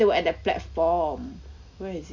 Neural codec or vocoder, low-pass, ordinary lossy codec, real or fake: none; 7.2 kHz; MP3, 64 kbps; real